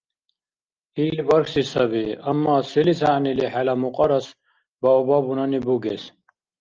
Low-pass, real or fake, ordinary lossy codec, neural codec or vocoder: 7.2 kHz; real; Opus, 24 kbps; none